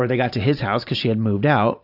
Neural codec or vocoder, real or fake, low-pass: none; real; 5.4 kHz